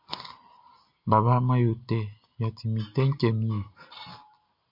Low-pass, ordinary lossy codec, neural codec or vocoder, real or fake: 5.4 kHz; MP3, 48 kbps; none; real